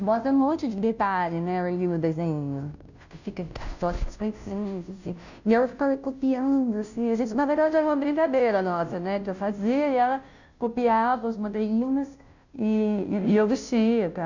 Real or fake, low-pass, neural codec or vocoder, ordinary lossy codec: fake; 7.2 kHz; codec, 16 kHz, 0.5 kbps, FunCodec, trained on Chinese and English, 25 frames a second; none